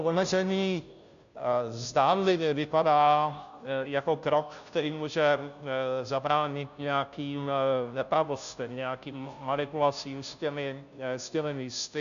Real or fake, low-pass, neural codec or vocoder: fake; 7.2 kHz; codec, 16 kHz, 0.5 kbps, FunCodec, trained on Chinese and English, 25 frames a second